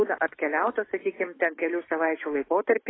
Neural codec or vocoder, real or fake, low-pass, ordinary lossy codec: autoencoder, 48 kHz, 128 numbers a frame, DAC-VAE, trained on Japanese speech; fake; 7.2 kHz; AAC, 16 kbps